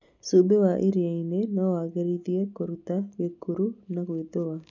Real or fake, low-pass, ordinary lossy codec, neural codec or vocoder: real; 7.2 kHz; none; none